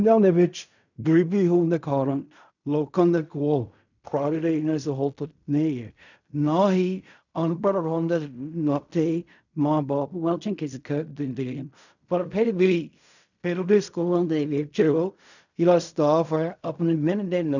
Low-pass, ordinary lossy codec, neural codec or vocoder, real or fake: 7.2 kHz; none; codec, 16 kHz in and 24 kHz out, 0.4 kbps, LongCat-Audio-Codec, fine tuned four codebook decoder; fake